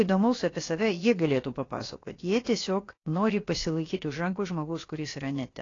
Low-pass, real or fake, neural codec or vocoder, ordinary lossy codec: 7.2 kHz; fake; codec, 16 kHz, about 1 kbps, DyCAST, with the encoder's durations; AAC, 32 kbps